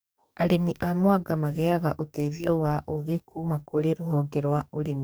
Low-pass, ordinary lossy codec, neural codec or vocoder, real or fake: none; none; codec, 44.1 kHz, 2.6 kbps, DAC; fake